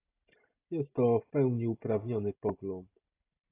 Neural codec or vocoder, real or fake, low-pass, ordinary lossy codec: none; real; 3.6 kHz; AAC, 24 kbps